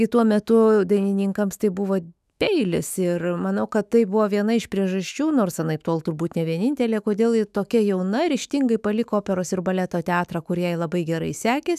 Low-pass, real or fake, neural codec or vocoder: 14.4 kHz; fake; autoencoder, 48 kHz, 128 numbers a frame, DAC-VAE, trained on Japanese speech